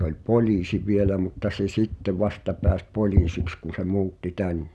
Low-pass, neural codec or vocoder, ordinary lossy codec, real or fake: none; none; none; real